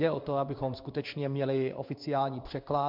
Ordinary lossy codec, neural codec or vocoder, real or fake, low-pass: MP3, 48 kbps; codec, 16 kHz in and 24 kHz out, 1 kbps, XY-Tokenizer; fake; 5.4 kHz